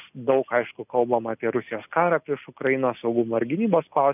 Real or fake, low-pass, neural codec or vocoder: real; 3.6 kHz; none